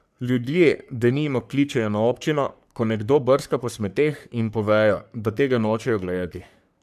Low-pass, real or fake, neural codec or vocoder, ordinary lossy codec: 14.4 kHz; fake; codec, 44.1 kHz, 3.4 kbps, Pupu-Codec; none